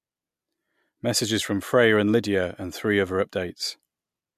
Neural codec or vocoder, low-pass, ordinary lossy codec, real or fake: none; 14.4 kHz; MP3, 96 kbps; real